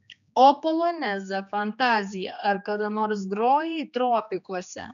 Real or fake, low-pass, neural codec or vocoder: fake; 7.2 kHz; codec, 16 kHz, 2 kbps, X-Codec, HuBERT features, trained on general audio